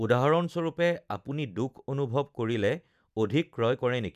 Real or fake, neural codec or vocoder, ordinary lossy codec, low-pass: real; none; none; 14.4 kHz